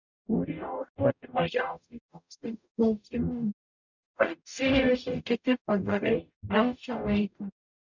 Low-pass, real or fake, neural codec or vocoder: 7.2 kHz; fake; codec, 44.1 kHz, 0.9 kbps, DAC